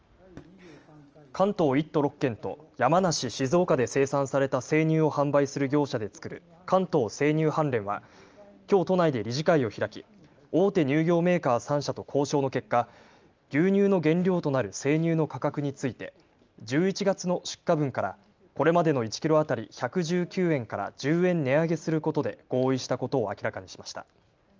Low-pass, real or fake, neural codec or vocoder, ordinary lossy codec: 7.2 kHz; real; none; Opus, 24 kbps